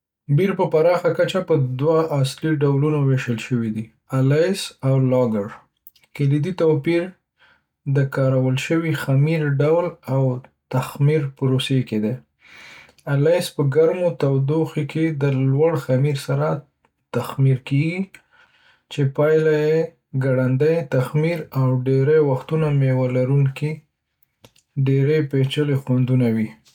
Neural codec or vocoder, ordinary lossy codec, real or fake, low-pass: none; none; real; 19.8 kHz